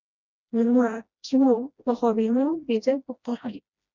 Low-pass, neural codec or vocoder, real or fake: 7.2 kHz; codec, 16 kHz, 1 kbps, FreqCodec, smaller model; fake